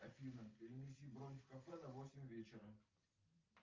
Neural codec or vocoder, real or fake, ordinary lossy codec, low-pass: none; real; Opus, 24 kbps; 7.2 kHz